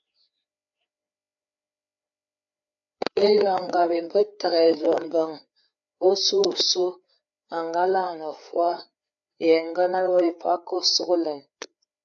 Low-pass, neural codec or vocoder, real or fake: 7.2 kHz; codec, 16 kHz, 4 kbps, FreqCodec, larger model; fake